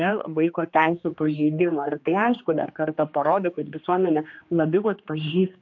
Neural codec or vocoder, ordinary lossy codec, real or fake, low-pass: codec, 16 kHz, 2 kbps, X-Codec, HuBERT features, trained on general audio; MP3, 48 kbps; fake; 7.2 kHz